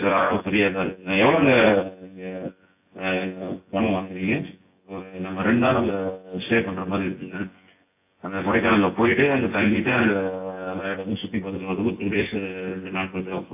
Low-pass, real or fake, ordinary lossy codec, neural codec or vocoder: 3.6 kHz; fake; AAC, 24 kbps; vocoder, 24 kHz, 100 mel bands, Vocos